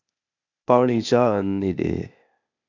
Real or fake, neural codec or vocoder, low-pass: fake; codec, 16 kHz, 0.8 kbps, ZipCodec; 7.2 kHz